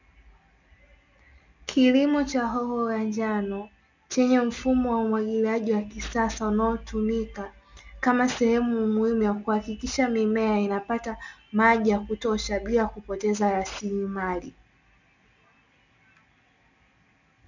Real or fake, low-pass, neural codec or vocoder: real; 7.2 kHz; none